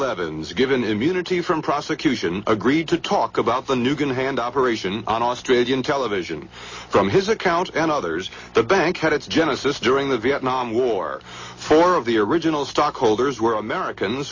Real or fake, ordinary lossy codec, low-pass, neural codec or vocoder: real; MP3, 32 kbps; 7.2 kHz; none